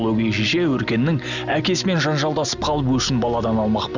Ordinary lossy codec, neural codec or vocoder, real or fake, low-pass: none; none; real; 7.2 kHz